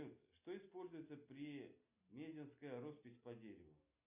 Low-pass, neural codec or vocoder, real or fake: 3.6 kHz; none; real